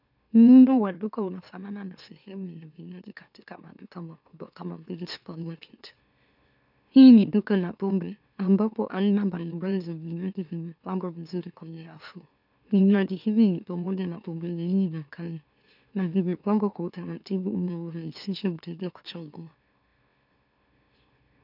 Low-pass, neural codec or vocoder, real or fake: 5.4 kHz; autoencoder, 44.1 kHz, a latent of 192 numbers a frame, MeloTTS; fake